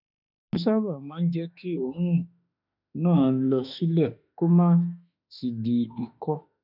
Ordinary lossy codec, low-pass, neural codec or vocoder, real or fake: none; 5.4 kHz; autoencoder, 48 kHz, 32 numbers a frame, DAC-VAE, trained on Japanese speech; fake